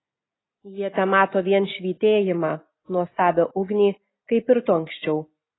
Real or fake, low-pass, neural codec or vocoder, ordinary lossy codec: real; 7.2 kHz; none; AAC, 16 kbps